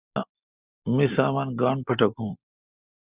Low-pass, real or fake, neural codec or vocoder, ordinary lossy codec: 3.6 kHz; real; none; Opus, 64 kbps